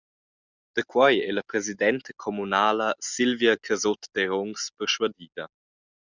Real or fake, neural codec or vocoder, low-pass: real; none; 7.2 kHz